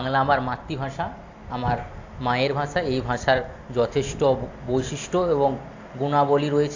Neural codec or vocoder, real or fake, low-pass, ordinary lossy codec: none; real; 7.2 kHz; AAC, 48 kbps